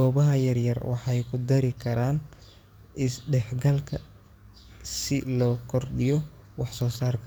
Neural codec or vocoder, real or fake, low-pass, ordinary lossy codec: codec, 44.1 kHz, 7.8 kbps, DAC; fake; none; none